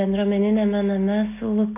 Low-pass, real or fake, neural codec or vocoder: 3.6 kHz; real; none